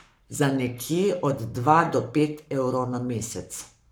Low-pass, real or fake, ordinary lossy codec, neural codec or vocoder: none; fake; none; codec, 44.1 kHz, 7.8 kbps, Pupu-Codec